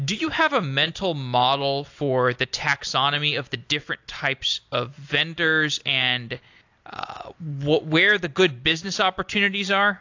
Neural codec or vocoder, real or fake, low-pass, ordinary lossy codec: none; real; 7.2 kHz; AAC, 48 kbps